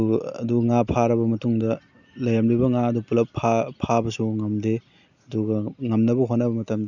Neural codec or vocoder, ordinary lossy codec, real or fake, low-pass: none; none; real; 7.2 kHz